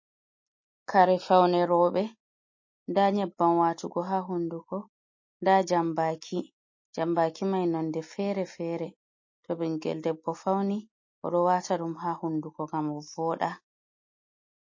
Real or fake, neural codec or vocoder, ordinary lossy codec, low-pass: real; none; MP3, 32 kbps; 7.2 kHz